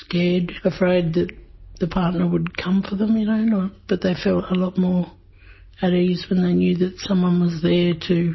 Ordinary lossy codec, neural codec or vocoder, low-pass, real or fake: MP3, 24 kbps; none; 7.2 kHz; real